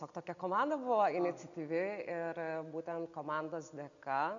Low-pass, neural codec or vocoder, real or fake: 7.2 kHz; none; real